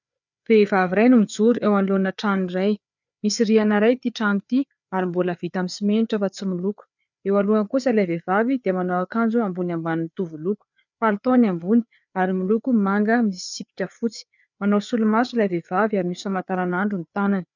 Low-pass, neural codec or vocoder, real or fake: 7.2 kHz; codec, 16 kHz, 4 kbps, FreqCodec, larger model; fake